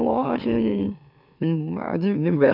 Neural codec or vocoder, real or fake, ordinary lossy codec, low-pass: autoencoder, 44.1 kHz, a latent of 192 numbers a frame, MeloTTS; fake; none; 5.4 kHz